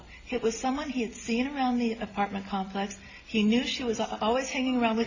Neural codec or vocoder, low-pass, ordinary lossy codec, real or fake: none; 7.2 kHz; AAC, 32 kbps; real